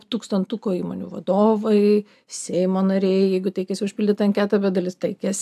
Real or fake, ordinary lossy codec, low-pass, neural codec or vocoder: real; AAC, 96 kbps; 14.4 kHz; none